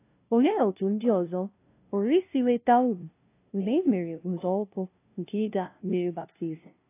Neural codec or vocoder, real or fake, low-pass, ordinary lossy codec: codec, 16 kHz, 0.5 kbps, FunCodec, trained on LibriTTS, 25 frames a second; fake; 3.6 kHz; AAC, 24 kbps